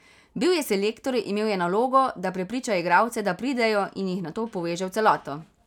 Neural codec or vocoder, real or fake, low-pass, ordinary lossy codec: none; real; 19.8 kHz; none